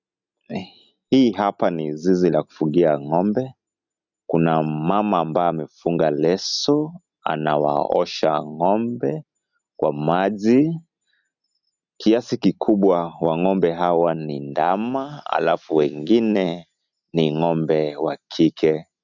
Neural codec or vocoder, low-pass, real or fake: none; 7.2 kHz; real